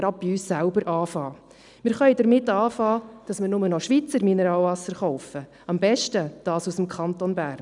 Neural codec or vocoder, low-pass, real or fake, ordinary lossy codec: none; 10.8 kHz; real; none